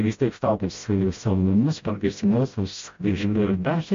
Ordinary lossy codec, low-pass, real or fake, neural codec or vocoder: MP3, 48 kbps; 7.2 kHz; fake; codec, 16 kHz, 0.5 kbps, FreqCodec, smaller model